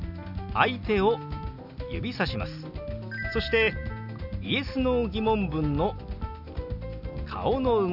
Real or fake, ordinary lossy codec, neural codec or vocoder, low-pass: real; none; none; 5.4 kHz